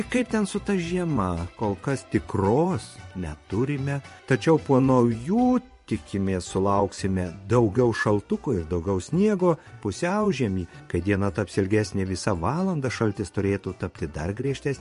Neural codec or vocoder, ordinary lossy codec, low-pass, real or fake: vocoder, 24 kHz, 100 mel bands, Vocos; MP3, 48 kbps; 10.8 kHz; fake